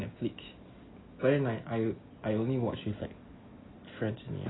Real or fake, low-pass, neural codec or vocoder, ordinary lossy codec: real; 7.2 kHz; none; AAC, 16 kbps